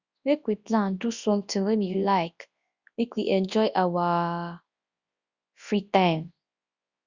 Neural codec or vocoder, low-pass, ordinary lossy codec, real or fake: codec, 24 kHz, 0.9 kbps, WavTokenizer, large speech release; 7.2 kHz; Opus, 64 kbps; fake